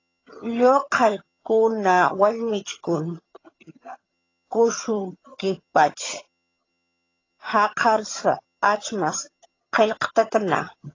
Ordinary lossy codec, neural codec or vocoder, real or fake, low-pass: AAC, 32 kbps; vocoder, 22.05 kHz, 80 mel bands, HiFi-GAN; fake; 7.2 kHz